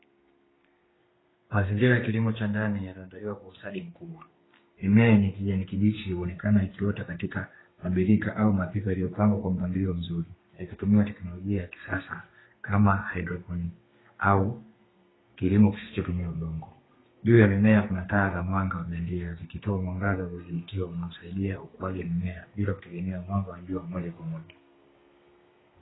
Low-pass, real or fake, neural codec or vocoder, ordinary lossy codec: 7.2 kHz; fake; codec, 32 kHz, 1.9 kbps, SNAC; AAC, 16 kbps